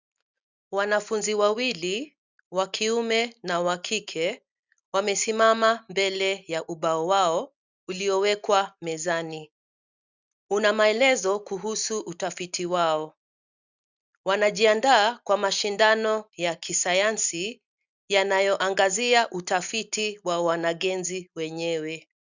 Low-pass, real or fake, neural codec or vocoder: 7.2 kHz; real; none